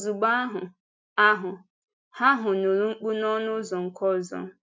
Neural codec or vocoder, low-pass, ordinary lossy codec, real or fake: none; none; none; real